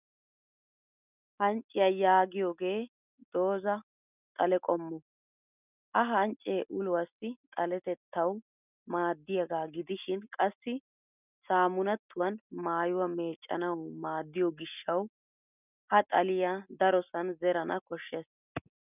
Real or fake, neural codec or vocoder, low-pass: real; none; 3.6 kHz